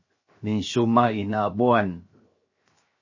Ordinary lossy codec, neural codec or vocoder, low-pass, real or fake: MP3, 32 kbps; codec, 16 kHz, 0.7 kbps, FocalCodec; 7.2 kHz; fake